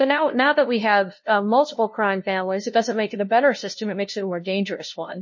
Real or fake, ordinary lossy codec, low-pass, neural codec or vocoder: fake; MP3, 32 kbps; 7.2 kHz; codec, 16 kHz, 0.5 kbps, FunCodec, trained on LibriTTS, 25 frames a second